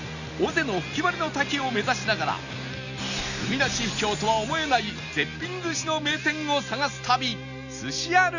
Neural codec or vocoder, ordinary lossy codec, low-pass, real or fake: none; none; 7.2 kHz; real